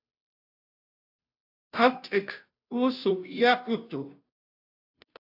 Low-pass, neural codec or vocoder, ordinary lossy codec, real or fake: 5.4 kHz; codec, 16 kHz, 0.5 kbps, FunCodec, trained on Chinese and English, 25 frames a second; AAC, 48 kbps; fake